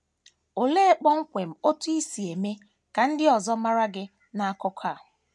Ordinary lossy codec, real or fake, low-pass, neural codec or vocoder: none; real; none; none